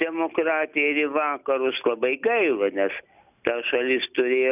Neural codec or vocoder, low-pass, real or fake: none; 3.6 kHz; real